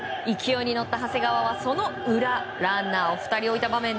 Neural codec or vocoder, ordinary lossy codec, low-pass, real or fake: none; none; none; real